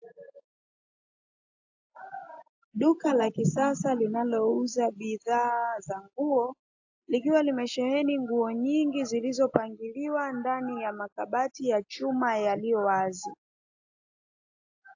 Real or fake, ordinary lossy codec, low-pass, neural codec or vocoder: real; AAC, 48 kbps; 7.2 kHz; none